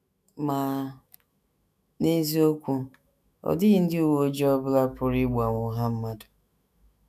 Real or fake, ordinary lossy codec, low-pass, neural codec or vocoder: fake; none; 14.4 kHz; autoencoder, 48 kHz, 128 numbers a frame, DAC-VAE, trained on Japanese speech